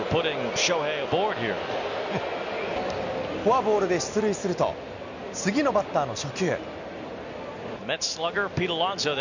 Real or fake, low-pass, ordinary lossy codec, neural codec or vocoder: real; 7.2 kHz; none; none